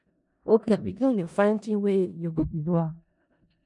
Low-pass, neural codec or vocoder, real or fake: 10.8 kHz; codec, 16 kHz in and 24 kHz out, 0.4 kbps, LongCat-Audio-Codec, four codebook decoder; fake